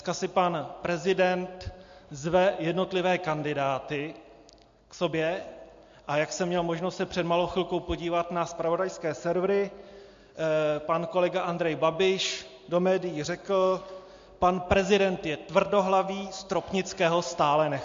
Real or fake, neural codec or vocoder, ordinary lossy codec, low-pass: real; none; MP3, 48 kbps; 7.2 kHz